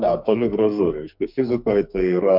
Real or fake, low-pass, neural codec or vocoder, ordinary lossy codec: fake; 5.4 kHz; codec, 44.1 kHz, 2.6 kbps, SNAC; MP3, 48 kbps